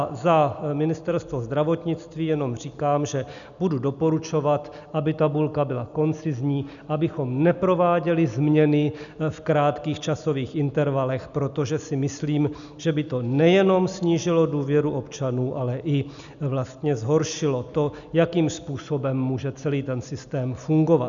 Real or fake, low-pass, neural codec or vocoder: real; 7.2 kHz; none